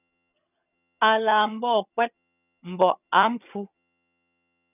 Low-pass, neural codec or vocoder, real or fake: 3.6 kHz; vocoder, 22.05 kHz, 80 mel bands, HiFi-GAN; fake